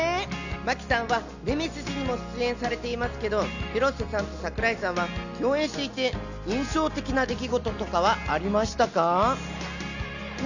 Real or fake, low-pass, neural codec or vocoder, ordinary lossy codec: real; 7.2 kHz; none; none